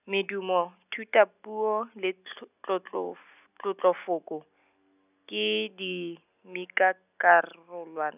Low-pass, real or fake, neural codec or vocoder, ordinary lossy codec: 3.6 kHz; real; none; none